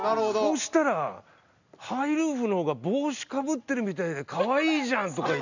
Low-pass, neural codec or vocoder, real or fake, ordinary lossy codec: 7.2 kHz; none; real; none